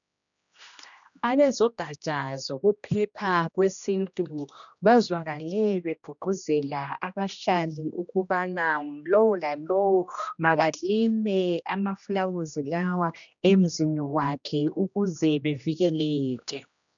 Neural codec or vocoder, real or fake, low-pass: codec, 16 kHz, 1 kbps, X-Codec, HuBERT features, trained on general audio; fake; 7.2 kHz